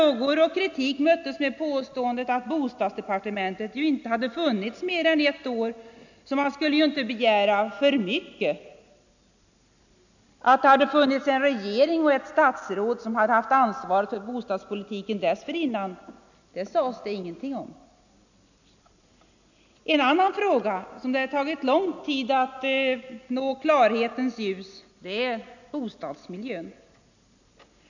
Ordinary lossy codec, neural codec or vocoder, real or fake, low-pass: none; none; real; 7.2 kHz